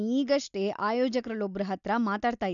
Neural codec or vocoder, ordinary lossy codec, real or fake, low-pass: none; none; real; 7.2 kHz